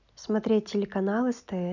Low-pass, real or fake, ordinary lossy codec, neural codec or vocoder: 7.2 kHz; real; none; none